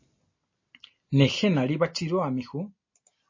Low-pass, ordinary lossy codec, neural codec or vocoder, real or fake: 7.2 kHz; MP3, 32 kbps; none; real